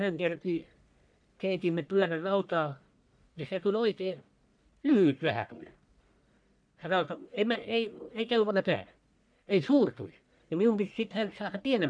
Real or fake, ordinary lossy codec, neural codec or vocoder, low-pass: fake; none; codec, 44.1 kHz, 1.7 kbps, Pupu-Codec; 9.9 kHz